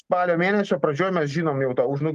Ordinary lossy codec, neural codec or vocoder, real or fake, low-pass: Opus, 24 kbps; none; real; 14.4 kHz